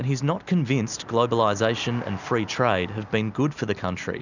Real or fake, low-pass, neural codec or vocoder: real; 7.2 kHz; none